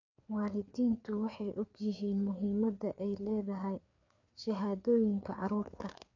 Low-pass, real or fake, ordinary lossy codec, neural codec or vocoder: 7.2 kHz; fake; MP3, 48 kbps; codec, 16 kHz, 4 kbps, FreqCodec, larger model